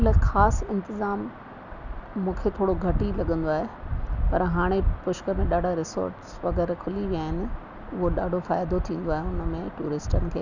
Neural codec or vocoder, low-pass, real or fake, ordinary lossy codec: none; 7.2 kHz; real; none